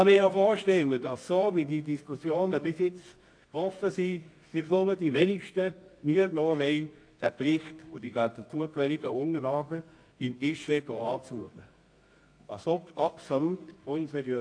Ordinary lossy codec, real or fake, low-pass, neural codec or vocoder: AAC, 48 kbps; fake; 9.9 kHz; codec, 24 kHz, 0.9 kbps, WavTokenizer, medium music audio release